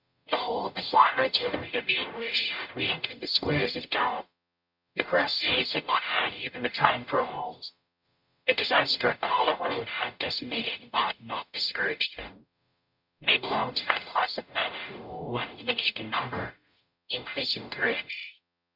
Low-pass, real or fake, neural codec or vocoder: 5.4 kHz; fake; codec, 44.1 kHz, 0.9 kbps, DAC